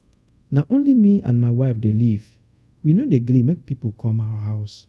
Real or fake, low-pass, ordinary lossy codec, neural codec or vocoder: fake; none; none; codec, 24 kHz, 0.5 kbps, DualCodec